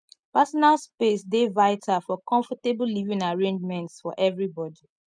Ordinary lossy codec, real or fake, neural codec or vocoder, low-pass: none; real; none; 9.9 kHz